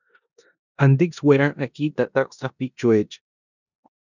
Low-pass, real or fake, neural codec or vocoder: 7.2 kHz; fake; codec, 16 kHz in and 24 kHz out, 0.9 kbps, LongCat-Audio-Codec, four codebook decoder